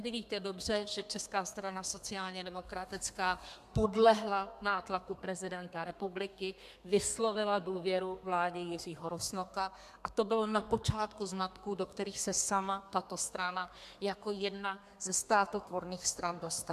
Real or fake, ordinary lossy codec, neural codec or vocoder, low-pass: fake; MP3, 96 kbps; codec, 32 kHz, 1.9 kbps, SNAC; 14.4 kHz